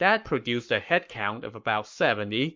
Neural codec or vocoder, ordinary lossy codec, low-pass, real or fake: codec, 44.1 kHz, 7.8 kbps, Pupu-Codec; MP3, 64 kbps; 7.2 kHz; fake